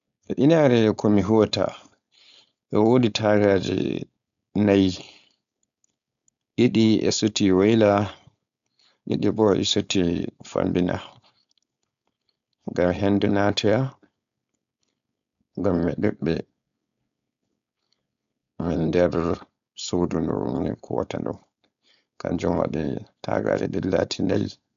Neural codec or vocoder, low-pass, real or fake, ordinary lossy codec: codec, 16 kHz, 4.8 kbps, FACodec; 7.2 kHz; fake; AAC, 96 kbps